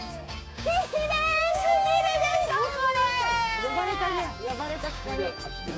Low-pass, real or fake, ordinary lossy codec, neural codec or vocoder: none; fake; none; codec, 16 kHz, 6 kbps, DAC